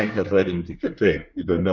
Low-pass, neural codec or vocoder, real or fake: 7.2 kHz; codec, 24 kHz, 1 kbps, SNAC; fake